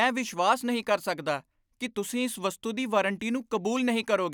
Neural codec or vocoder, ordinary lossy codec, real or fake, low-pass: none; none; real; none